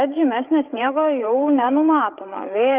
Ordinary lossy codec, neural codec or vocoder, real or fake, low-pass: Opus, 24 kbps; codec, 16 kHz, 8 kbps, FreqCodec, larger model; fake; 3.6 kHz